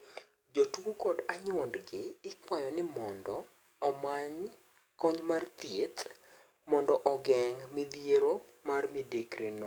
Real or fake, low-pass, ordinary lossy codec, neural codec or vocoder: fake; none; none; codec, 44.1 kHz, 7.8 kbps, DAC